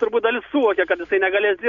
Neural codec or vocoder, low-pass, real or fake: none; 7.2 kHz; real